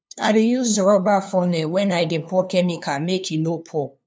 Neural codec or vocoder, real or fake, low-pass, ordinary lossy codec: codec, 16 kHz, 2 kbps, FunCodec, trained on LibriTTS, 25 frames a second; fake; none; none